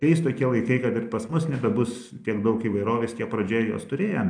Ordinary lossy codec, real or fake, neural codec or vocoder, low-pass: MP3, 96 kbps; real; none; 9.9 kHz